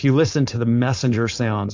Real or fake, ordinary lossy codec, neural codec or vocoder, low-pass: fake; AAC, 48 kbps; codec, 16 kHz, 4.8 kbps, FACodec; 7.2 kHz